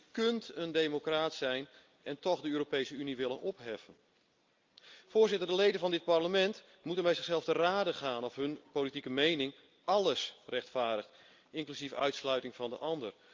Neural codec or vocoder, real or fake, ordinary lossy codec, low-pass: none; real; Opus, 24 kbps; 7.2 kHz